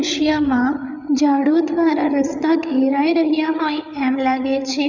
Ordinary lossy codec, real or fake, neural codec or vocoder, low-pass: none; fake; codec, 16 kHz, 8 kbps, FreqCodec, larger model; 7.2 kHz